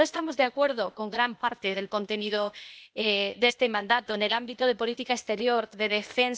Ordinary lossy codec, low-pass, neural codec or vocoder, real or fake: none; none; codec, 16 kHz, 0.8 kbps, ZipCodec; fake